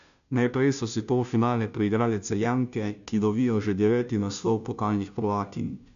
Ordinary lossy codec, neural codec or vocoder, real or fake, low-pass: none; codec, 16 kHz, 0.5 kbps, FunCodec, trained on Chinese and English, 25 frames a second; fake; 7.2 kHz